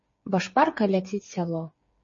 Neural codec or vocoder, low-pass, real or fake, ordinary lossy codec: codec, 16 kHz, 8 kbps, FreqCodec, smaller model; 7.2 kHz; fake; MP3, 32 kbps